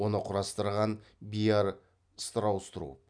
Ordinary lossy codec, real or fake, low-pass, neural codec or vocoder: none; real; 9.9 kHz; none